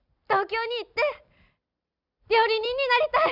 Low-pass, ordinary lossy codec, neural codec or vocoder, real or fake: 5.4 kHz; none; none; real